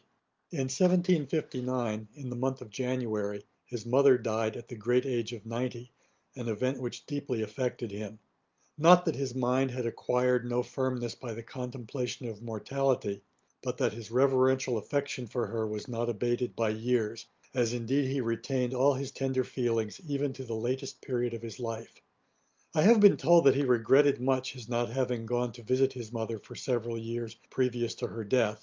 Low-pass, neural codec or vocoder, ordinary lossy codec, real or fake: 7.2 kHz; none; Opus, 24 kbps; real